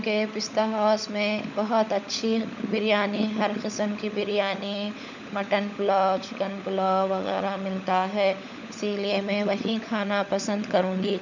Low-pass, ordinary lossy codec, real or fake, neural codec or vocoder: 7.2 kHz; none; fake; codec, 16 kHz, 16 kbps, FunCodec, trained on LibriTTS, 50 frames a second